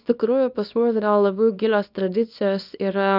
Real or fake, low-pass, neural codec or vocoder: fake; 5.4 kHz; codec, 24 kHz, 0.9 kbps, WavTokenizer, small release